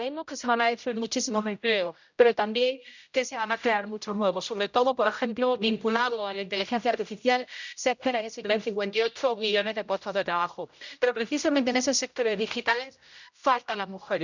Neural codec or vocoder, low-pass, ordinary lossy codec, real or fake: codec, 16 kHz, 0.5 kbps, X-Codec, HuBERT features, trained on general audio; 7.2 kHz; none; fake